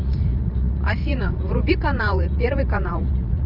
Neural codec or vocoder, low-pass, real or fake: vocoder, 44.1 kHz, 80 mel bands, Vocos; 5.4 kHz; fake